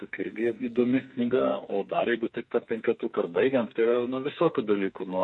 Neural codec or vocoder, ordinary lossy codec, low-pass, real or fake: codec, 44.1 kHz, 2.6 kbps, SNAC; MP3, 48 kbps; 10.8 kHz; fake